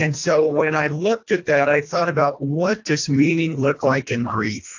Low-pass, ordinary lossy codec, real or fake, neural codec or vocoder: 7.2 kHz; AAC, 48 kbps; fake; codec, 24 kHz, 1.5 kbps, HILCodec